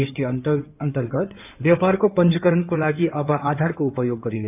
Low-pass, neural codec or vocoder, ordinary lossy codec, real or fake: 3.6 kHz; codec, 16 kHz in and 24 kHz out, 2.2 kbps, FireRedTTS-2 codec; none; fake